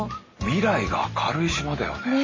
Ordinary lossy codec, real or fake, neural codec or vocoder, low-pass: MP3, 32 kbps; real; none; 7.2 kHz